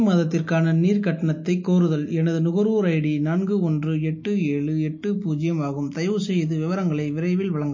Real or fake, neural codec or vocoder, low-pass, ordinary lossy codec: real; none; 7.2 kHz; MP3, 32 kbps